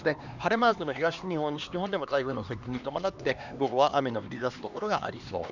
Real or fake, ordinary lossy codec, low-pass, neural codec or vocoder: fake; none; 7.2 kHz; codec, 16 kHz, 2 kbps, X-Codec, HuBERT features, trained on LibriSpeech